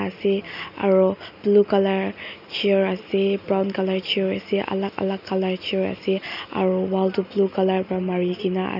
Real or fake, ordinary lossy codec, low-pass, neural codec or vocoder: real; none; 5.4 kHz; none